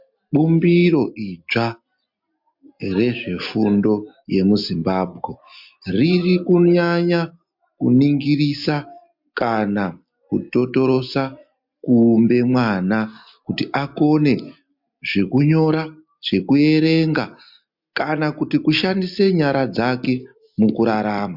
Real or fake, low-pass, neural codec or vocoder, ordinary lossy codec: real; 5.4 kHz; none; MP3, 48 kbps